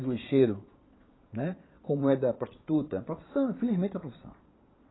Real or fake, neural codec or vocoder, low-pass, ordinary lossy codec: fake; vocoder, 22.05 kHz, 80 mel bands, Vocos; 7.2 kHz; AAC, 16 kbps